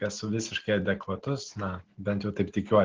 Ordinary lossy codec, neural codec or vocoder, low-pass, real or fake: Opus, 16 kbps; none; 7.2 kHz; real